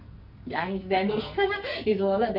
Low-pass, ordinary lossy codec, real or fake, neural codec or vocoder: 5.4 kHz; none; fake; codec, 44.1 kHz, 2.6 kbps, SNAC